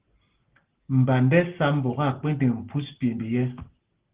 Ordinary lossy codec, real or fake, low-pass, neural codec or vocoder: Opus, 16 kbps; real; 3.6 kHz; none